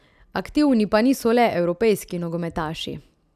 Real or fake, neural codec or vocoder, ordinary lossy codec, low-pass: real; none; none; 14.4 kHz